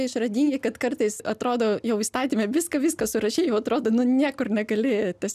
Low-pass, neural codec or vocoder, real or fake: 14.4 kHz; none; real